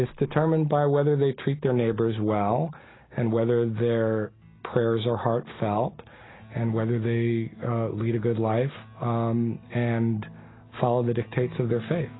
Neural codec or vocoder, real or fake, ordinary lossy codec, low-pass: none; real; AAC, 16 kbps; 7.2 kHz